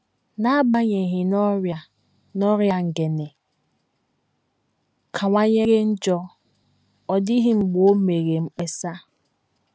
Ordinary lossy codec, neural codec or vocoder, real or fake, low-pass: none; none; real; none